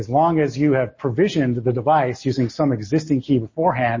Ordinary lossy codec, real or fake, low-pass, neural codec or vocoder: MP3, 32 kbps; real; 7.2 kHz; none